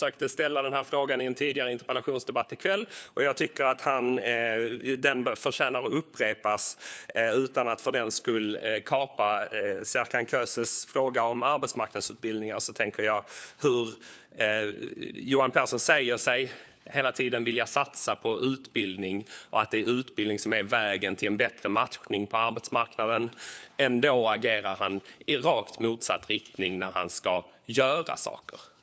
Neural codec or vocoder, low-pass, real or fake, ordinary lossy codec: codec, 16 kHz, 4 kbps, FunCodec, trained on LibriTTS, 50 frames a second; none; fake; none